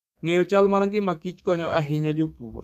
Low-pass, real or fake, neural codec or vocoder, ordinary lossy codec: 14.4 kHz; fake; codec, 32 kHz, 1.9 kbps, SNAC; none